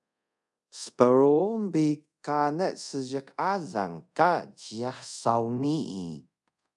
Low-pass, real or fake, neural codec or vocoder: 10.8 kHz; fake; codec, 24 kHz, 0.5 kbps, DualCodec